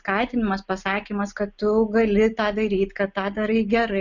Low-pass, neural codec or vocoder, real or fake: 7.2 kHz; none; real